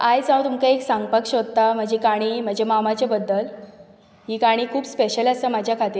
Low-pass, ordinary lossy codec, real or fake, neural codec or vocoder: none; none; real; none